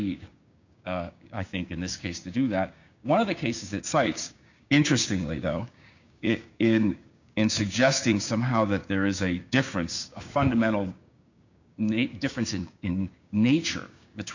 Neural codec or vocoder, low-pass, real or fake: codec, 16 kHz, 6 kbps, DAC; 7.2 kHz; fake